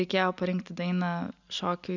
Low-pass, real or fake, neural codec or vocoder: 7.2 kHz; real; none